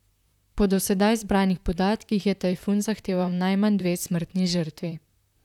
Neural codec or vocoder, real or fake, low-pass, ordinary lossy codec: vocoder, 44.1 kHz, 128 mel bands, Pupu-Vocoder; fake; 19.8 kHz; none